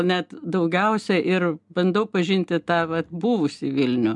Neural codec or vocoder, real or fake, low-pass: none; real; 10.8 kHz